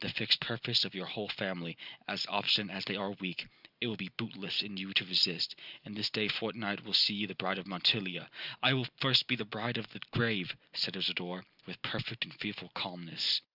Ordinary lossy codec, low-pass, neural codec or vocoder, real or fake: Opus, 64 kbps; 5.4 kHz; none; real